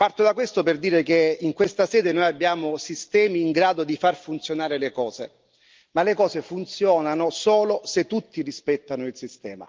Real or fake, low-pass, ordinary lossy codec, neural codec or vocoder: real; 7.2 kHz; Opus, 24 kbps; none